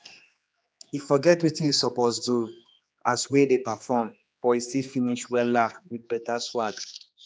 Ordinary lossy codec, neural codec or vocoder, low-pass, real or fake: none; codec, 16 kHz, 2 kbps, X-Codec, HuBERT features, trained on general audio; none; fake